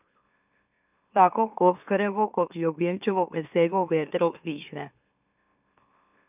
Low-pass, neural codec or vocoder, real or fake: 3.6 kHz; autoencoder, 44.1 kHz, a latent of 192 numbers a frame, MeloTTS; fake